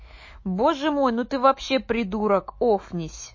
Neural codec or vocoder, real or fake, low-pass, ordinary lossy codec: autoencoder, 48 kHz, 128 numbers a frame, DAC-VAE, trained on Japanese speech; fake; 7.2 kHz; MP3, 32 kbps